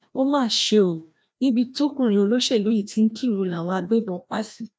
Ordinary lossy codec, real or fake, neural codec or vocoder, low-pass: none; fake; codec, 16 kHz, 1 kbps, FreqCodec, larger model; none